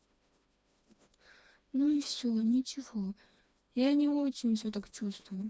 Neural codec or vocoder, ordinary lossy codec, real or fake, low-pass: codec, 16 kHz, 2 kbps, FreqCodec, smaller model; none; fake; none